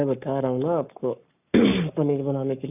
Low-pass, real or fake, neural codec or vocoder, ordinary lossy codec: 3.6 kHz; fake; codec, 44.1 kHz, 7.8 kbps, Pupu-Codec; none